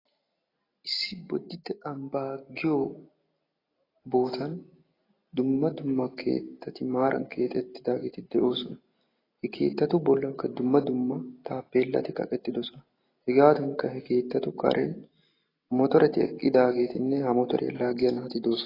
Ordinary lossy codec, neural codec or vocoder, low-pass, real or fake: AAC, 24 kbps; none; 5.4 kHz; real